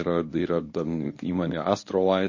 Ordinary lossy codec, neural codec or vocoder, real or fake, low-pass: MP3, 32 kbps; codec, 24 kHz, 0.9 kbps, WavTokenizer, medium speech release version 1; fake; 7.2 kHz